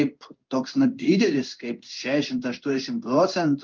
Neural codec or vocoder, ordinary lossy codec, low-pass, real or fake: codec, 16 kHz in and 24 kHz out, 1 kbps, XY-Tokenizer; Opus, 24 kbps; 7.2 kHz; fake